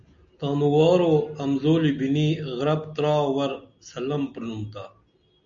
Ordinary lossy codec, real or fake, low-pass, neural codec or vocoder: MP3, 96 kbps; real; 7.2 kHz; none